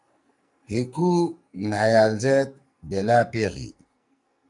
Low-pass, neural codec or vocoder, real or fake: 10.8 kHz; codec, 44.1 kHz, 2.6 kbps, SNAC; fake